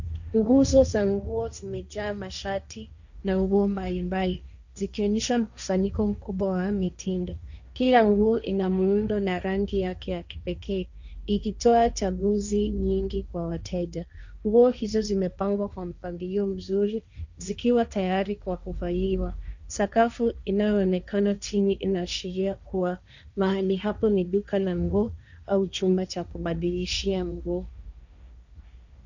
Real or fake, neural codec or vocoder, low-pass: fake; codec, 16 kHz, 1.1 kbps, Voila-Tokenizer; 7.2 kHz